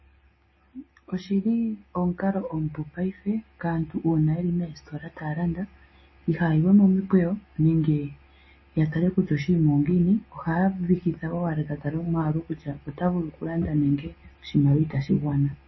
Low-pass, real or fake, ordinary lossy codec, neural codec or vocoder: 7.2 kHz; real; MP3, 24 kbps; none